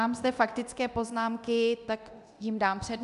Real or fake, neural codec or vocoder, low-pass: fake; codec, 24 kHz, 0.9 kbps, DualCodec; 10.8 kHz